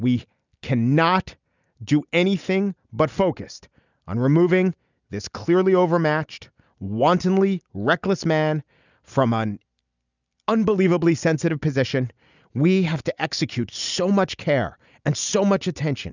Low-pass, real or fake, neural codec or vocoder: 7.2 kHz; real; none